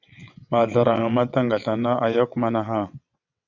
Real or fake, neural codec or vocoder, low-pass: fake; vocoder, 22.05 kHz, 80 mel bands, WaveNeXt; 7.2 kHz